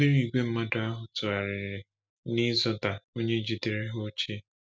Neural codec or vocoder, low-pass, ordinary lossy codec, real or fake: none; none; none; real